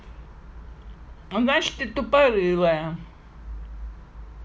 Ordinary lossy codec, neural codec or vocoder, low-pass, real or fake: none; none; none; real